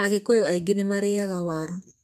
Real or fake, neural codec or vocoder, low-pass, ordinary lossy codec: fake; codec, 32 kHz, 1.9 kbps, SNAC; 14.4 kHz; none